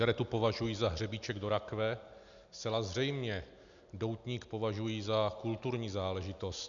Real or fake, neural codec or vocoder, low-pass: real; none; 7.2 kHz